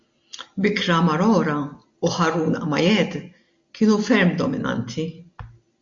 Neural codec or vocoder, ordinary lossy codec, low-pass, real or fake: none; MP3, 48 kbps; 7.2 kHz; real